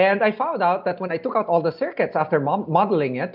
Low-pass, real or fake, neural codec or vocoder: 5.4 kHz; real; none